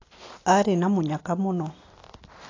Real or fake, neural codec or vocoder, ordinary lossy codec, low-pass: real; none; AAC, 32 kbps; 7.2 kHz